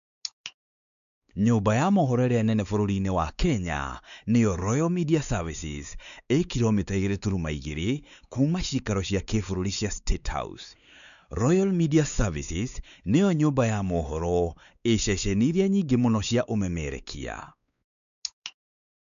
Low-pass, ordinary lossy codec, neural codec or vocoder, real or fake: 7.2 kHz; none; codec, 16 kHz, 4 kbps, X-Codec, WavLM features, trained on Multilingual LibriSpeech; fake